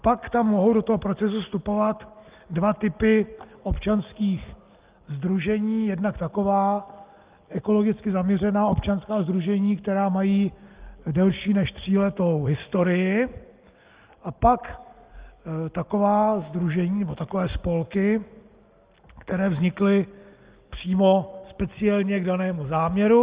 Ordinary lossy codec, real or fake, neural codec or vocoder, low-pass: Opus, 32 kbps; real; none; 3.6 kHz